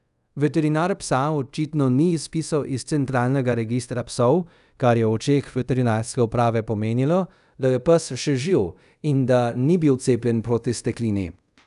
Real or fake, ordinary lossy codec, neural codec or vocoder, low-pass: fake; none; codec, 24 kHz, 0.5 kbps, DualCodec; 10.8 kHz